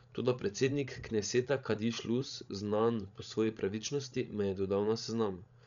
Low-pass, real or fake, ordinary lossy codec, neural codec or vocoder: 7.2 kHz; fake; none; codec, 16 kHz, 16 kbps, FreqCodec, larger model